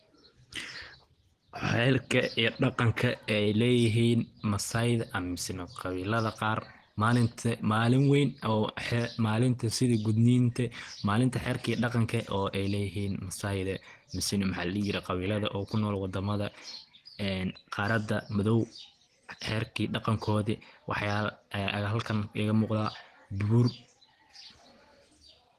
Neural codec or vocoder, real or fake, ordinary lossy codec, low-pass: none; real; Opus, 16 kbps; 14.4 kHz